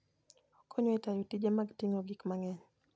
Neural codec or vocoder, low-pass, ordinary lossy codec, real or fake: none; none; none; real